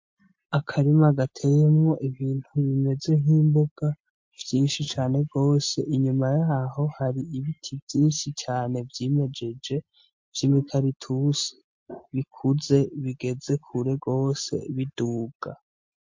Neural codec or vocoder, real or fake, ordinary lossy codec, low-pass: none; real; MP3, 48 kbps; 7.2 kHz